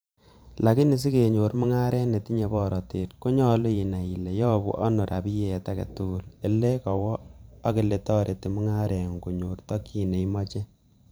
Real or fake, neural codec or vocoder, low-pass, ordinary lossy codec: fake; vocoder, 44.1 kHz, 128 mel bands every 512 samples, BigVGAN v2; none; none